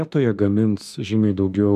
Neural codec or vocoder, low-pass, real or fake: autoencoder, 48 kHz, 32 numbers a frame, DAC-VAE, trained on Japanese speech; 14.4 kHz; fake